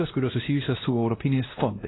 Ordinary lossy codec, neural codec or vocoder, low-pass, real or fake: AAC, 16 kbps; codec, 16 kHz, 1 kbps, X-Codec, WavLM features, trained on Multilingual LibriSpeech; 7.2 kHz; fake